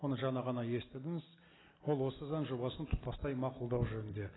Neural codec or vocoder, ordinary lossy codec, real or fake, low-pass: none; AAC, 16 kbps; real; 7.2 kHz